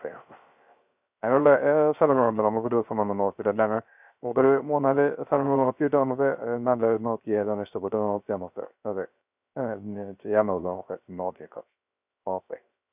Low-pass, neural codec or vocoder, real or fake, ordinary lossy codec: 3.6 kHz; codec, 16 kHz, 0.3 kbps, FocalCodec; fake; none